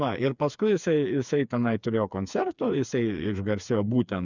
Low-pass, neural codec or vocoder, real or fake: 7.2 kHz; codec, 16 kHz, 4 kbps, FreqCodec, smaller model; fake